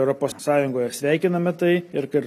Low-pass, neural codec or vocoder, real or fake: 14.4 kHz; none; real